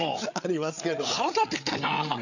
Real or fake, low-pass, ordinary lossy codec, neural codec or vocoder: fake; 7.2 kHz; none; codec, 16 kHz, 8 kbps, FreqCodec, larger model